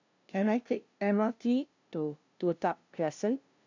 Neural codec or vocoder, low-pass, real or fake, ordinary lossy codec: codec, 16 kHz, 0.5 kbps, FunCodec, trained on LibriTTS, 25 frames a second; 7.2 kHz; fake; MP3, 64 kbps